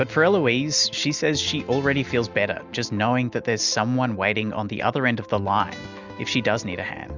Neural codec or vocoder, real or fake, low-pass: none; real; 7.2 kHz